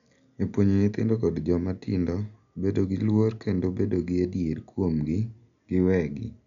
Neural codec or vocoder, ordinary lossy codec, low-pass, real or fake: none; none; 7.2 kHz; real